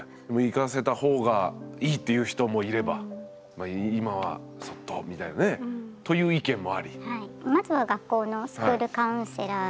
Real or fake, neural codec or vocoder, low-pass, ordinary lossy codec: real; none; none; none